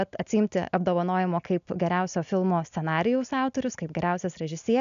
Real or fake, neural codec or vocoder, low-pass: real; none; 7.2 kHz